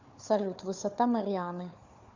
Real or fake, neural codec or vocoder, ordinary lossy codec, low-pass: fake; codec, 16 kHz, 4 kbps, FunCodec, trained on Chinese and English, 50 frames a second; Opus, 64 kbps; 7.2 kHz